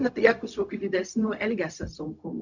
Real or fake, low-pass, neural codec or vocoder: fake; 7.2 kHz; codec, 16 kHz, 0.4 kbps, LongCat-Audio-Codec